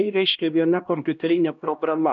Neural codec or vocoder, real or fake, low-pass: codec, 16 kHz, 1 kbps, X-Codec, HuBERT features, trained on LibriSpeech; fake; 7.2 kHz